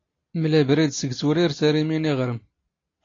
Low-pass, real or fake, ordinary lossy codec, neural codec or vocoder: 7.2 kHz; real; AAC, 32 kbps; none